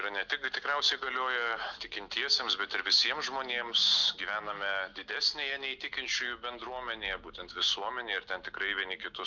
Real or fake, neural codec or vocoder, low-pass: real; none; 7.2 kHz